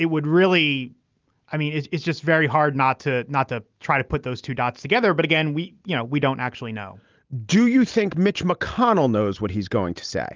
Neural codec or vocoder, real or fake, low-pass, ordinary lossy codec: none; real; 7.2 kHz; Opus, 24 kbps